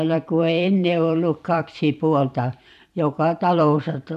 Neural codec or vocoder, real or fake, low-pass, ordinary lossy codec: vocoder, 44.1 kHz, 128 mel bands every 512 samples, BigVGAN v2; fake; 14.4 kHz; none